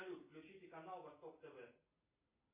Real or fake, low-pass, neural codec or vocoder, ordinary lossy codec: real; 3.6 kHz; none; MP3, 24 kbps